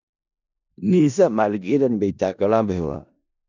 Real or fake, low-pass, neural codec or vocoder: fake; 7.2 kHz; codec, 16 kHz in and 24 kHz out, 0.4 kbps, LongCat-Audio-Codec, four codebook decoder